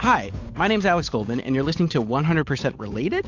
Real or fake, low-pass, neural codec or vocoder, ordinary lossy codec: fake; 7.2 kHz; codec, 16 kHz, 8 kbps, FunCodec, trained on Chinese and English, 25 frames a second; AAC, 48 kbps